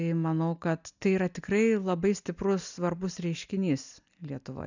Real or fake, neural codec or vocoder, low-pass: real; none; 7.2 kHz